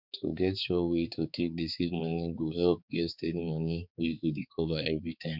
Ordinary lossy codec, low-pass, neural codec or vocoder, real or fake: none; 5.4 kHz; codec, 16 kHz, 4 kbps, X-Codec, HuBERT features, trained on balanced general audio; fake